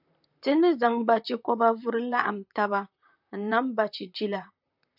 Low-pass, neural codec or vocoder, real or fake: 5.4 kHz; vocoder, 44.1 kHz, 128 mel bands, Pupu-Vocoder; fake